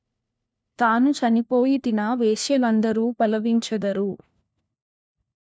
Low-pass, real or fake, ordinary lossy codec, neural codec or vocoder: none; fake; none; codec, 16 kHz, 1 kbps, FunCodec, trained on LibriTTS, 50 frames a second